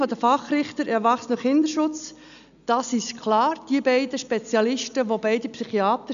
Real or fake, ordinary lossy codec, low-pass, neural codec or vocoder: real; none; 7.2 kHz; none